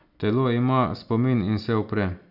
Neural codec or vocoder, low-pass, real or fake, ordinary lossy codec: none; 5.4 kHz; real; none